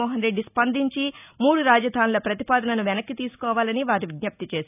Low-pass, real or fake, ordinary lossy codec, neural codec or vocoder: 3.6 kHz; real; none; none